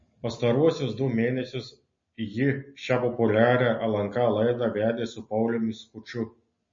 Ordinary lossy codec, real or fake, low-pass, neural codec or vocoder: MP3, 32 kbps; real; 7.2 kHz; none